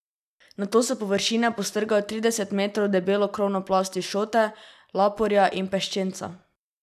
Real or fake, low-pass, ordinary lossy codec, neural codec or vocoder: real; 14.4 kHz; none; none